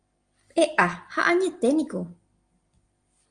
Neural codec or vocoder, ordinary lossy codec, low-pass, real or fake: none; Opus, 32 kbps; 9.9 kHz; real